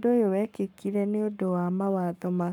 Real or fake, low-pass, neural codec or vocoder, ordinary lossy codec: fake; 19.8 kHz; codec, 44.1 kHz, 7.8 kbps, Pupu-Codec; none